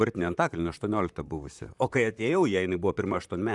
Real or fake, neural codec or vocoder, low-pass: fake; vocoder, 44.1 kHz, 128 mel bands, Pupu-Vocoder; 10.8 kHz